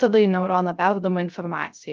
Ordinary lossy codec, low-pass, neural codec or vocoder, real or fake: Opus, 24 kbps; 7.2 kHz; codec, 16 kHz, 0.3 kbps, FocalCodec; fake